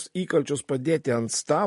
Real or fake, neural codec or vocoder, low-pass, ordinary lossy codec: real; none; 14.4 kHz; MP3, 48 kbps